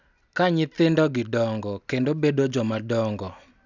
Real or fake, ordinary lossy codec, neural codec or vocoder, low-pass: real; none; none; 7.2 kHz